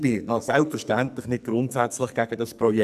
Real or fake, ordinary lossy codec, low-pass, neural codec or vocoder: fake; none; 14.4 kHz; codec, 44.1 kHz, 2.6 kbps, SNAC